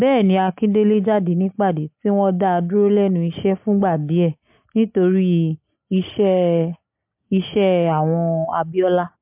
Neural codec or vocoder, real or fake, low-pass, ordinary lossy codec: none; real; 3.6 kHz; MP3, 32 kbps